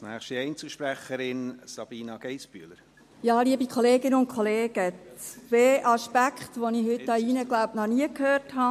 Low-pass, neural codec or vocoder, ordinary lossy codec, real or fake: 14.4 kHz; none; MP3, 64 kbps; real